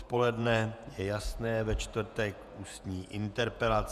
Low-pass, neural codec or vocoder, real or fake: 14.4 kHz; vocoder, 48 kHz, 128 mel bands, Vocos; fake